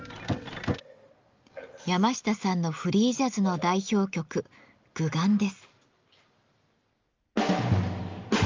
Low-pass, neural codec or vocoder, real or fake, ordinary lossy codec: 7.2 kHz; none; real; Opus, 32 kbps